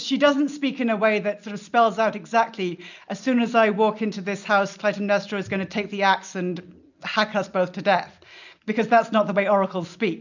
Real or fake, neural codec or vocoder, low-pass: real; none; 7.2 kHz